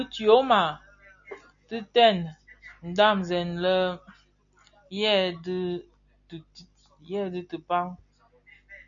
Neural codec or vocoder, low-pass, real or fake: none; 7.2 kHz; real